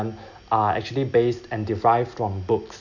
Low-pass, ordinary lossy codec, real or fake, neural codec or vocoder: 7.2 kHz; none; real; none